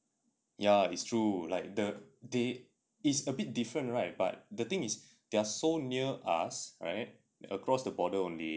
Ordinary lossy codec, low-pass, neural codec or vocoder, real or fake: none; none; none; real